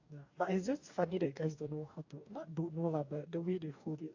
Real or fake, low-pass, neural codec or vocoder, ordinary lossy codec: fake; 7.2 kHz; codec, 44.1 kHz, 2.6 kbps, DAC; AAC, 48 kbps